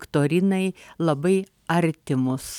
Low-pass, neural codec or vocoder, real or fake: 19.8 kHz; none; real